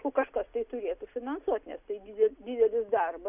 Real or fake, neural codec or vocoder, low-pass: real; none; 3.6 kHz